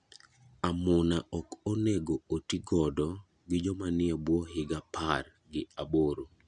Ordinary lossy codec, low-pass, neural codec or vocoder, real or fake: none; 9.9 kHz; none; real